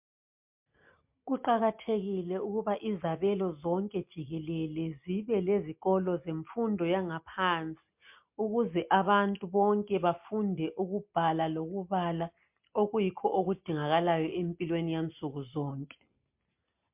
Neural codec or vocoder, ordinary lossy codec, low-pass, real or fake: none; MP3, 32 kbps; 3.6 kHz; real